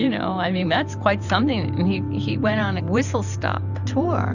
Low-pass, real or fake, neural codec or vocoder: 7.2 kHz; fake; vocoder, 44.1 kHz, 128 mel bands every 512 samples, BigVGAN v2